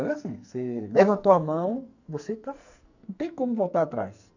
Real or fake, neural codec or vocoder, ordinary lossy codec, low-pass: fake; codec, 44.1 kHz, 2.6 kbps, SNAC; none; 7.2 kHz